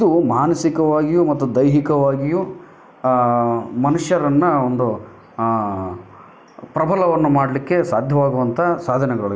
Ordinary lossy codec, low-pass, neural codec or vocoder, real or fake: none; none; none; real